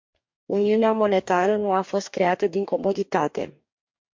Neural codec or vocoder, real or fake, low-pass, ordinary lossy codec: codec, 44.1 kHz, 2.6 kbps, DAC; fake; 7.2 kHz; MP3, 48 kbps